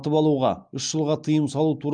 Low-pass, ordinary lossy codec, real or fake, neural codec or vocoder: 9.9 kHz; Opus, 32 kbps; real; none